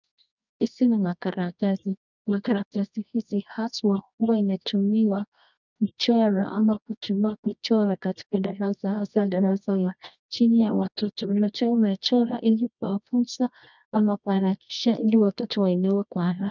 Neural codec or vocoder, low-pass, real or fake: codec, 24 kHz, 0.9 kbps, WavTokenizer, medium music audio release; 7.2 kHz; fake